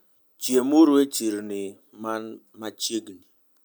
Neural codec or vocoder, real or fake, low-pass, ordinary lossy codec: none; real; none; none